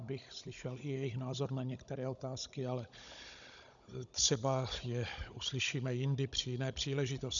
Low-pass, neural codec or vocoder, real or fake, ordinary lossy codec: 7.2 kHz; codec, 16 kHz, 16 kbps, FunCodec, trained on Chinese and English, 50 frames a second; fake; MP3, 96 kbps